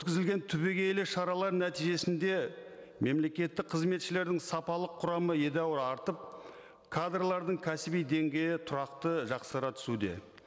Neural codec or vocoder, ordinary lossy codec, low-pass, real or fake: none; none; none; real